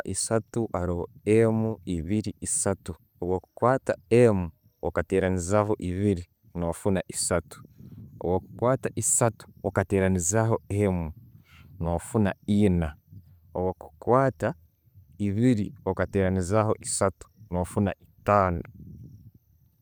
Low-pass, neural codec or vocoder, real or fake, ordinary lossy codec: none; none; real; none